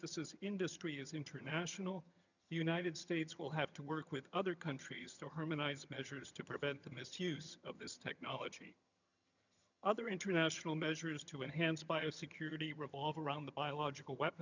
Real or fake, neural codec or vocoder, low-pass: fake; vocoder, 22.05 kHz, 80 mel bands, HiFi-GAN; 7.2 kHz